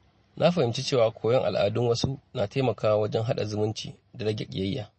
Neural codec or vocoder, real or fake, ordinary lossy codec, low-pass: none; real; MP3, 32 kbps; 9.9 kHz